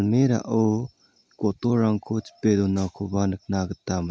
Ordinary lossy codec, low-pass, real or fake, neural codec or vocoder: none; none; real; none